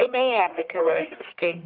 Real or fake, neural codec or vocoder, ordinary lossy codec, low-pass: fake; codec, 44.1 kHz, 1.7 kbps, Pupu-Codec; Opus, 24 kbps; 5.4 kHz